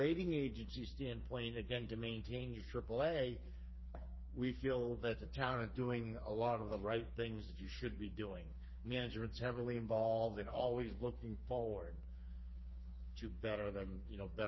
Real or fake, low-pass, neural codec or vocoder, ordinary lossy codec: fake; 7.2 kHz; codec, 16 kHz, 4 kbps, FreqCodec, smaller model; MP3, 24 kbps